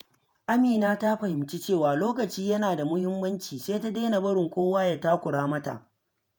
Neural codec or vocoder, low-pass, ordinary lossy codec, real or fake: vocoder, 48 kHz, 128 mel bands, Vocos; none; none; fake